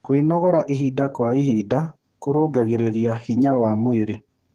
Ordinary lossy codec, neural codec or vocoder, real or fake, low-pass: Opus, 24 kbps; codec, 32 kHz, 1.9 kbps, SNAC; fake; 14.4 kHz